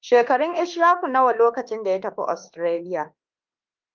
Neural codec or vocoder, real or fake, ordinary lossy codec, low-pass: autoencoder, 48 kHz, 32 numbers a frame, DAC-VAE, trained on Japanese speech; fake; Opus, 24 kbps; 7.2 kHz